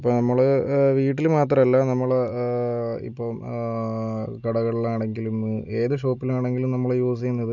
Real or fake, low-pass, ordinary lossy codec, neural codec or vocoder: real; 7.2 kHz; none; none